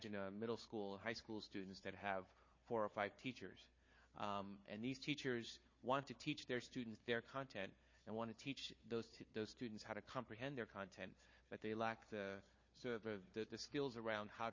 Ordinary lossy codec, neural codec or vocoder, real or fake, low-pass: MP3, 32 kbps; codec, 16 kHz, 4 kbps, FunCodec, trained on Chinese and English, 50 frames a second; fake; 7.2 kHz